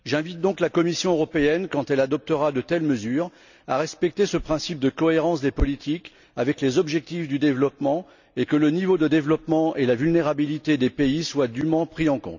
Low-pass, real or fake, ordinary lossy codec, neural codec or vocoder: 7.2 kHz; real; none; none